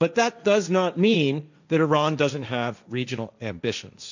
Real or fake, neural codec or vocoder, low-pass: fake; codec, 16 kHz, 1.1 kbps, Voila-Tokenizer; 7.2 kHz